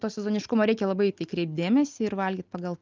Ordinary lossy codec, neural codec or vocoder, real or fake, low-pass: Opus, 24 kbps; none; real; 7.2 kHz